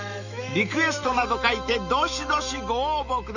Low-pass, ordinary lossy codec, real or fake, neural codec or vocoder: 7.2 kHz; none; fake; autoencoder, 48 kHz, 128 numbers a frame, DAC-VAE, trained on Japanese speech